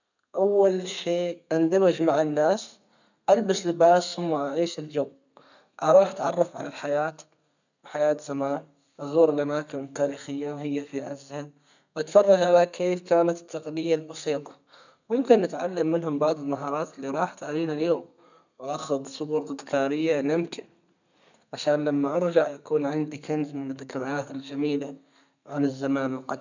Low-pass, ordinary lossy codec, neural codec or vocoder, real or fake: 7.2 kHz; none; codec, 32 kHz, 1.9 kbps, SNAC; fake